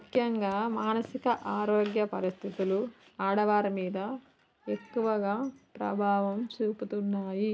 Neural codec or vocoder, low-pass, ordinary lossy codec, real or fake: none; none; none; real